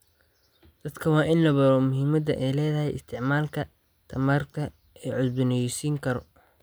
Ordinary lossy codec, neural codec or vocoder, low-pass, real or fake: none; none; none; real